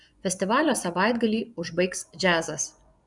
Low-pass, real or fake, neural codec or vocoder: 10.8 kHz; fake; vocoder, 24 kHz, 100 mel bands, Vocos